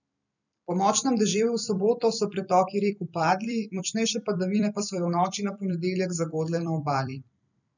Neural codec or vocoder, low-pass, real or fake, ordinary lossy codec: vocoder, 44.1 kHz, 128 mel bands every 256 samples, BigVGAN v2; 7.2 kHz; fake; none